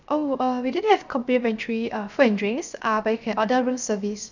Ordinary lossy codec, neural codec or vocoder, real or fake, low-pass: none; codec, 16 kHz, 0.7 kbps, FocalCodec; fake; 7.2 kHz